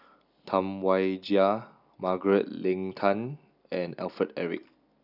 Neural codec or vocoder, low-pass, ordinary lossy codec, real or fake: none; 5.4 kHz; none; real